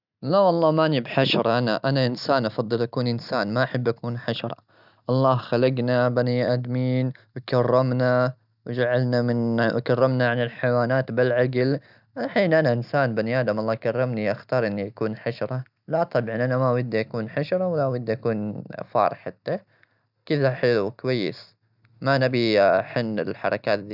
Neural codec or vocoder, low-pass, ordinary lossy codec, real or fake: none; 5.4 kHz; none; real